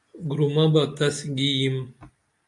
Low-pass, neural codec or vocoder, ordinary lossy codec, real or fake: 10.8 kHz; none; MP3, 64 kbps; real